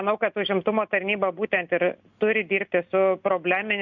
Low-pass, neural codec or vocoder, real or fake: 7.2 kHz; none; real